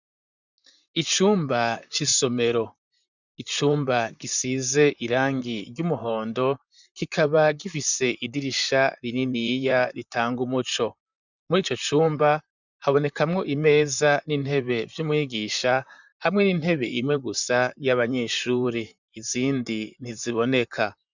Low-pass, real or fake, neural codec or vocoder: 7.2 kHz; fake; vocoder, 44.1 kHz, 128 mel bands, Pupu-Vocoder